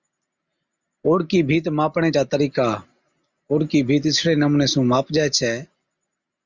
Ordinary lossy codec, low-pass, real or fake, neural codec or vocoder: Opus, 64 kbps; 7.2 kHz; real; none